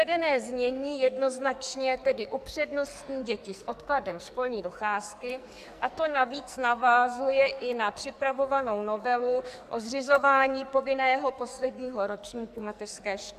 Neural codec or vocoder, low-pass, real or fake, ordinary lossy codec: codec, 44.1 kHz, 2.6 kbps, SNAC; 14.4 kHz; fake; AAC, 96 kbps